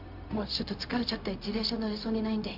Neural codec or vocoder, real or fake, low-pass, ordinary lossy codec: codec, 16 kHz, 0.4 kbps, LongCat-Audio-Codec; fake; 5.4 kHz; none